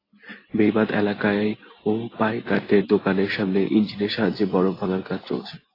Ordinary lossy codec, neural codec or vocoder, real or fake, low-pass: AAC, 24 kbps; none; real; 5.4 kHz